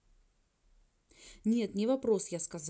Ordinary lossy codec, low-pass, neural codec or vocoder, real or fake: none; none; none; real